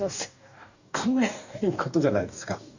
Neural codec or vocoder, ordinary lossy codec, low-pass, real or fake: codec, 44.1 kHz, 2.6 kbps, DAC; none; 7.2 kHz; fake